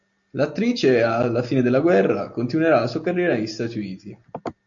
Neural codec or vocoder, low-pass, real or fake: none; 7.2 kHz; real